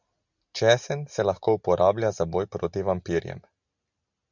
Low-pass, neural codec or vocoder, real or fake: 7.2 kHz; none; real